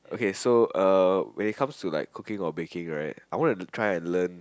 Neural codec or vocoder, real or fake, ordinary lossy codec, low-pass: none; real; none; none